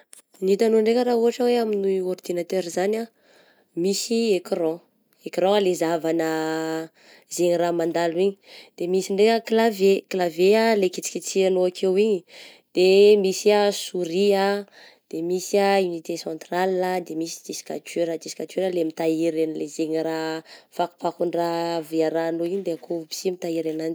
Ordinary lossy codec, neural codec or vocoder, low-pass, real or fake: none; none; none; real